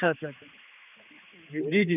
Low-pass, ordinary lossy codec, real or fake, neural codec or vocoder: 3.6 kHz; none; fake; codec, 16 kHz, 4 kbps, X-Codec, HuBERT features, trained on general audio